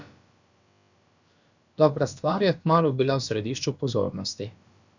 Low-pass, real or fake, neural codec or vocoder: 7.2 kHz; fake; codec, 16 kHz, about 1 kbps, DyCAST, with the encoder's durations